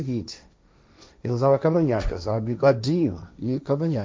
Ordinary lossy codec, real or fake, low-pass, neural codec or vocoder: none; fake; none; codec, 16 kHz, 1.1 kbps, Voila-Tokenizer